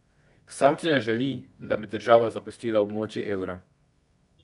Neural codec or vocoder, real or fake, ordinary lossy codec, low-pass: codec, 24 kHz, 0.9 kbps, WavTokenizer, medium music audio release; fake; none; 10.8 kHz